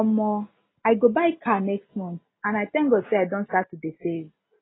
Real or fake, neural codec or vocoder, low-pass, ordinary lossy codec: real; none; 7.2 kHz; AAC, 16 kbps